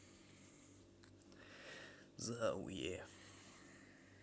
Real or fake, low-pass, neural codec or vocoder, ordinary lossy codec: real; none; none; none